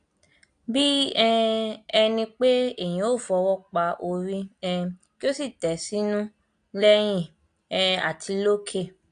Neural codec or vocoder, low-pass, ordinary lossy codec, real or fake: none; 9.9 kHz; AAC, 64 kbps; real